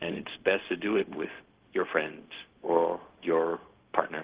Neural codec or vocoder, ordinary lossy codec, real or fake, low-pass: codec, 16 kHz, 0.4 kbps, LongCat-Audio-Codec; Opus, 16 kbps; fake; 3.6 kHz